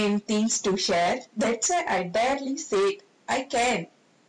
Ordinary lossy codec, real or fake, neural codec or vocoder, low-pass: MP3, 64 kbps; real; none; 9.9 kHz